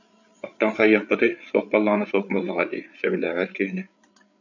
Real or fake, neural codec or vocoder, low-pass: fake; codec, 16 kHz, 8 kbps, FreqCodec, larger model; 7.2 kHz